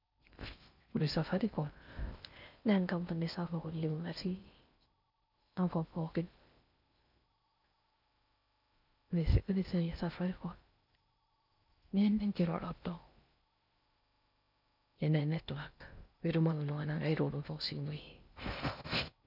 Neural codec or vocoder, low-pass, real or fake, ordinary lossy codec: codec, 16 kHz in and 24 kHz out, 0.6 kbps, FocalCodec, streaming, 4096 codes; 5.4 kHz; fake; none